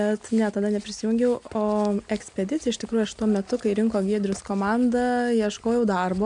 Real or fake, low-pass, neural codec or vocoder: real; 9.9 kHz; none